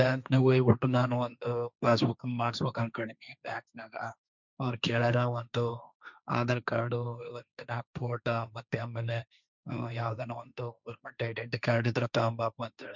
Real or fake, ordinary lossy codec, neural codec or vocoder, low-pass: fake; none; codec, 16 kHz, 1.1 kbps, Voila-Tokenizer; 7.2 kHz